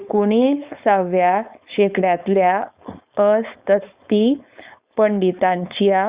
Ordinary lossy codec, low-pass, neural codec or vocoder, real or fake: Opus, 64 kbps; 3.6 kHz; codec, 16 kHz, 4.8 kbps, FACodec; fake